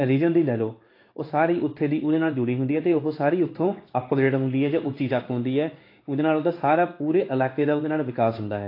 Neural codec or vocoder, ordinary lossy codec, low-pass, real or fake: codec, 16 kHz in and 24 kHz out, 1 kbps, XY-Tokenizer; AAC, 32 kbps; 5.4 kHz; fake